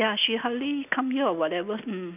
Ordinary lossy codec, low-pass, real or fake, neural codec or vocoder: none; 3.6 kHz; real; none